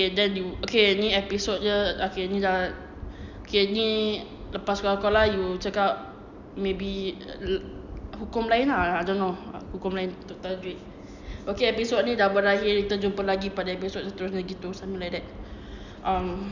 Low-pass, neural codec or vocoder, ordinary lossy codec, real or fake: 7.2 kHz; none; none; real